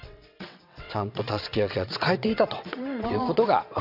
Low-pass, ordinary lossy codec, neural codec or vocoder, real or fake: 5.4 kHz; none; vocoder, 22.05 kHz, 80 mel bands, WaveNeXt; fake